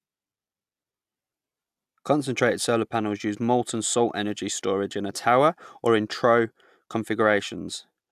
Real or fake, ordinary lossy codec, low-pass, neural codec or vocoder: real; AAC, 96 kbps; 14.4 kHz; none